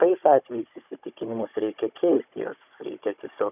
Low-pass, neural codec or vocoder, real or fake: 3.6 kHz; codec, 16 kHz, 16 kbps, FunCodec, trained on Chinese and English, 50 frames a second; fake